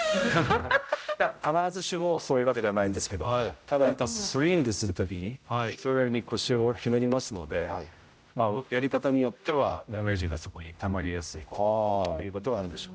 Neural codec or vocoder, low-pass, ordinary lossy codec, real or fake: codec, 16 kHz, 0.5 kbps, X-Codec, HuBERT features, trained on general audio; none; none; fake